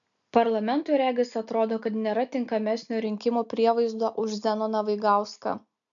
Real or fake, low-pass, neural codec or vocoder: real; 7.2 kHz; none